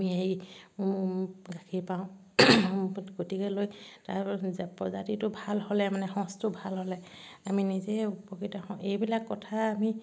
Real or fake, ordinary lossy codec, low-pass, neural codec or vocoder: real; none; none; none